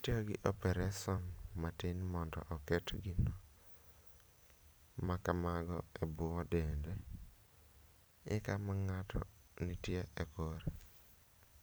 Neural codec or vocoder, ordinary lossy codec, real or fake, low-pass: vocoder, 44.1 kHz, 128 mel bands every 256 samples, BigVGAN v2; none; fake; none